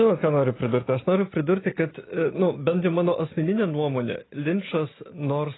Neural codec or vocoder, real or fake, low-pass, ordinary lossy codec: none; real; 7.2 kHz; AAC, 16 kbps